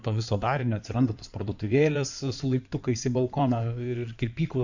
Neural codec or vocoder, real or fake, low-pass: codec, 16 kHz in and 24 kHz out, 2.2 kbps, FireRedTTS-2 codec; fake; 7.2 kHz